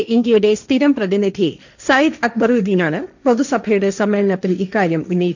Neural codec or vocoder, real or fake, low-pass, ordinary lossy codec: codec, 16 kHz, 1.1 kbps, Voila-Tokenizer; fake; 7.2 kHz; none